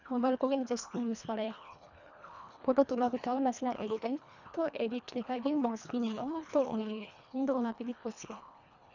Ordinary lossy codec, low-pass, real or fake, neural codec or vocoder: none; 7.2 kHz; fake; codec, 24 kHz, 1.5 kbps, HILCodec